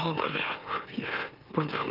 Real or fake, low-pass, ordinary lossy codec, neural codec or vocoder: fake; 5.4 kHz; Opus, 32 kbps; autoencoder, 44.1 kHz, a latent of 192 numbers a frame, MeloTTS